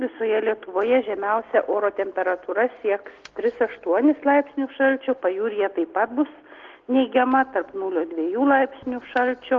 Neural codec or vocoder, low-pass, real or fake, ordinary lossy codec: none; 7.2 kHz; real; Opus, 16 kbps